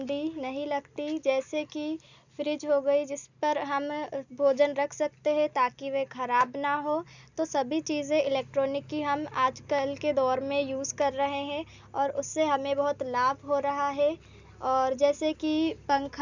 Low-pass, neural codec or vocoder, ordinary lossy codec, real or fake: 7.2 kHz; none; none; real